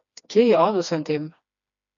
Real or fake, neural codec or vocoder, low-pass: fake; codec, 16 kHz, 2 kbps, FreqCodec, smaller model; 7.2 kHz